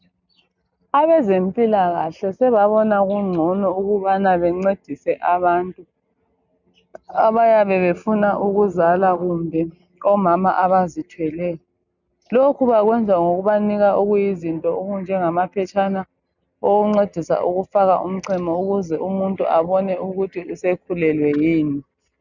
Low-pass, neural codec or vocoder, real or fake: 7.2 kHz; none; real